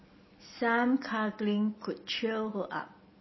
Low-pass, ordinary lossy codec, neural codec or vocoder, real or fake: 7.2 kHz; MP3, 24 kbps; none; real